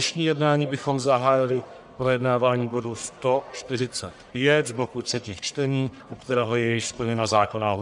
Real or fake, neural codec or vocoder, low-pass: fake; codec, 44.1 kHz, 1.7 kbps, Pupu-Codec; 10.8 kHz